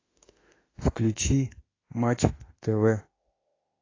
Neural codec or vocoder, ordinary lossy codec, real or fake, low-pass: autoencoder, 48 kHz, 32 numbers a frame, DAC-VAE, trained on Japanese speech; AAC, 32 kbps; fake; 7.2 kHz